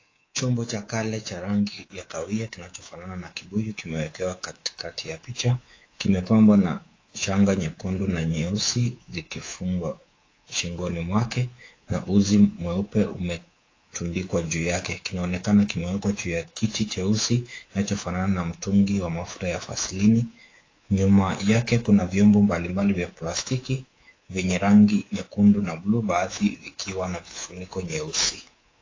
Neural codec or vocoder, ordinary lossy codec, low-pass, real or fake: codec, 24 kHz, 3.1 kbps, DualCodec; AAC, 32 kbps; 7.2 kHz; fake